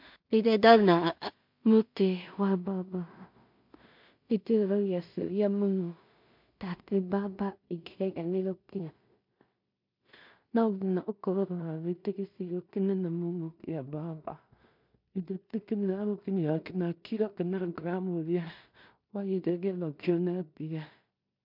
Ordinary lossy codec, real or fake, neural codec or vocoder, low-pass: MP3, 48 kbps; fake; codec, 16 kHz in and 24 kHz out, 0.4 kbps, LongCat-Audio-Codec, two codebook decoder; 5.4 kHz